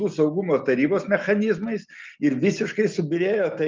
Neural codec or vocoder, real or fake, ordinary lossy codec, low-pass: none; real; Opus, 24 kbps; 7.2 kHz